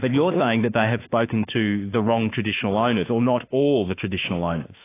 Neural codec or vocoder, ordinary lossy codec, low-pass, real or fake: autoencoder, 48 kHz, 32 numbers a frame, DAC-VAE, trained on Japanese speech; AAC, 24 kbps; 3.6 kHz; fake